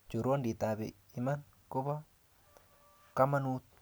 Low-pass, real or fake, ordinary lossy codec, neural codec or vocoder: none; real; none; none